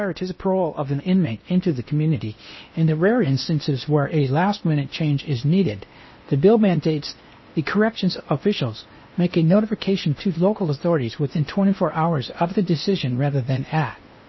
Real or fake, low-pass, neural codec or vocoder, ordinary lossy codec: fake; 7.2 kHz; codec, 16 kHz in and 24 kHz out, 0.8 kbps, FocalCodec, streaming, 65536 codes; MP3, 24 kbps